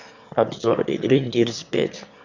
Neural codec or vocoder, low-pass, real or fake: autoencoder, 22.05 kHz, a latent of 192 numbers a frame, VITS, trained on one speaker; 7.2 kHz; fake